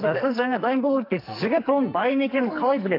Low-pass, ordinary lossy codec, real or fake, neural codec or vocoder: 5.4 kHz; AAC, 32 kbps; fake; codec, 44.1 kHz, 2.6 kbps, SNAC